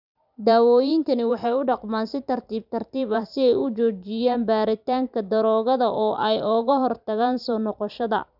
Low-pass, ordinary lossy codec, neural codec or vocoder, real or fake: 5.4 kHz; none; vocoder, 44.1 kHz, 128 mel bands every 512 samples, BigVGAN v2; fake